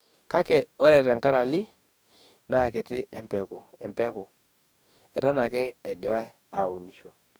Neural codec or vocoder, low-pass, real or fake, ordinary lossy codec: codec, 44.1 kHz, 2.6 kbps, DAC; none; fake; none